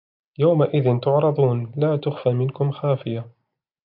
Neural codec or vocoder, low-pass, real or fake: none; 5.4 kHz; real